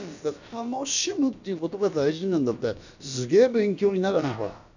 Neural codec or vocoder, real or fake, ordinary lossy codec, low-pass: codec, 16 kHz, about 1 kbps, DyCAST, with the encoder's durations; fake; none; 7.2 kHz